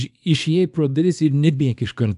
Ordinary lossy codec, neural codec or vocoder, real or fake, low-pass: AAC, 96 kbps; codec, 24 kHz, 0.9 kbps, WavTokenizer, small release; fake; 10.8 kHz